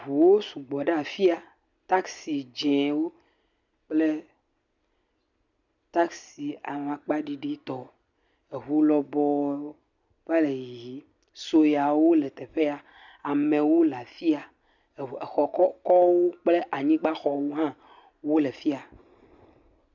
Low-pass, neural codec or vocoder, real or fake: 7.2 kHz; none; real